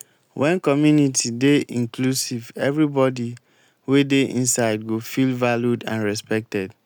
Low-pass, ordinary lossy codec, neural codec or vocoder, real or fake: 19.8 kHz; none; none; real